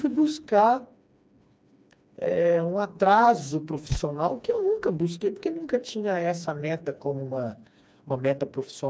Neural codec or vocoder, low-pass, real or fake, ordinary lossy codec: codec, 16 kHz, 2 kbps, FreqCodec, smaller model; none; fake; none